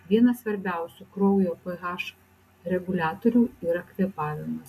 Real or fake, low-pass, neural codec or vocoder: real; 14.4 kHz; none